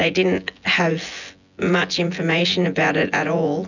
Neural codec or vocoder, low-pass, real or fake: vocoder, 24 kHz, 100 mel bands, Vocos; 7.2 kHz; fake